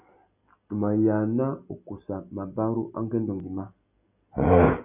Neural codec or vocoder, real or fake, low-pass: none; real; 3.6 kHz